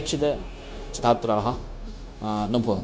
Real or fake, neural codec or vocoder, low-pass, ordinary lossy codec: fake; codec, 16 kHz, 0.9 kbps, LongCat-Audio-Codec; none; none